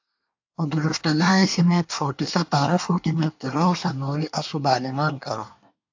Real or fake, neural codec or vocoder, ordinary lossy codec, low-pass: fake; codec, 24 kHz, 1 kbps, SNAC; AAC, 48 kbps; 7.2 kHz